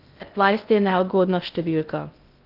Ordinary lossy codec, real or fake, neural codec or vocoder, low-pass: Opus, 24 kbps; fake; codec, 16 kHz in and 24 kHz out, 0.6 kbps, FocalCodec, streaming, 2048 codes; 5.4 kHz